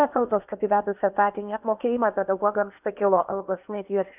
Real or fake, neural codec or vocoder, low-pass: fake; codec, 16 kHz in and 24 kHz out, 0.8 kbps, FocalCodec, streaming, 65536 codes; 3.6 kHz